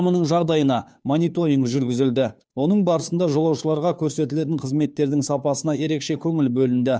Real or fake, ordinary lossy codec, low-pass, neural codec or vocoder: fake; none; none; codec, 16 kHz, 2 kbps, FunCodec, trained on Chinese and English, 25 frames a second